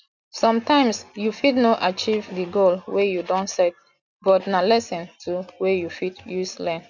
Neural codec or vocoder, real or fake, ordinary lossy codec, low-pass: none; real; none; 7.2 kHz